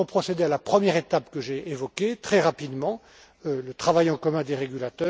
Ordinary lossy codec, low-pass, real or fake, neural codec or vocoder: none; none; real; none